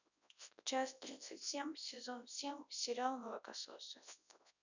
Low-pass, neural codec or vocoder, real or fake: 7.2 kHz; codec, 24 kHz, 0.9 kbps, WavTokenizer, large speech release; fake